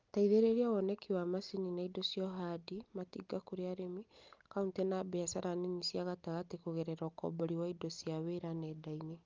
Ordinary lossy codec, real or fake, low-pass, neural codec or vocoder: Opus, 32 kbps; real; 7.2 kHz; none